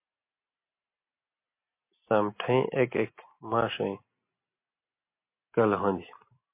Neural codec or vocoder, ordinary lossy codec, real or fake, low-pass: none; MP3, 24 kbps; real; 3.6 kHz